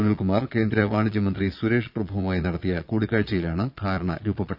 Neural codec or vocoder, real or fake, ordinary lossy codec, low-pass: vocoder, 22.05 kHz, 80 mel bands, Vocos; fake; none; 5.4 kHz